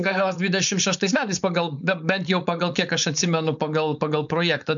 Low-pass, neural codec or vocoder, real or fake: 7.2 kHz; codec, 16 kHz, 4.8 kbps, FACodec; fake